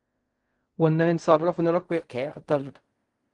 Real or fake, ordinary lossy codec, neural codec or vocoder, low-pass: fake; Opus, 24 kbps; codec, 16 kHz in and 24 kHz out, 0.4 kbps, LongCat-Audio-Codec, fine tuned four codebook decoder; 10.8 kHz